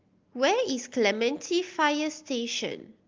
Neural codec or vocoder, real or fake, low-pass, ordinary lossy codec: none; real; 7.2 kHz; Opus, 24 kbps